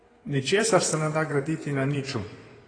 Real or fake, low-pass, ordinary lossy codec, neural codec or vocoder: fake; 9.9 kHz; AAC, 32 kbps; codec, 16 kHz in and 24 kHz out, 2.2 kbps, FireRedTTS-2 codec